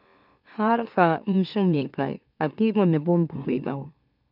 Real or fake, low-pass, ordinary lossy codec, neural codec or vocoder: fake; 5.4 kHz; none; autoencoder, 44.1 kHz, a latent of 192 numbers a frame, MeloTTS